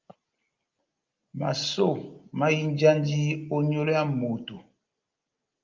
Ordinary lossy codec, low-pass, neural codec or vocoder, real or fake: Opus, 24 kbps; 7.2 kHz; none; real